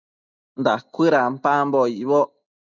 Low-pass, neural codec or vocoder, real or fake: 7.2 kHz; none; real